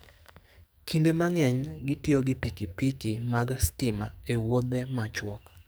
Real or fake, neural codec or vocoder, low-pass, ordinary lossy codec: fake; codec, 44.1 kHz, 2.6 kbps, SNAC; none; none